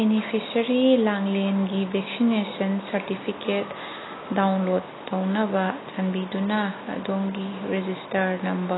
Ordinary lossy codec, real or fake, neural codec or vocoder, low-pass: AAC, 16 kbps; real; none; 7.2 kHz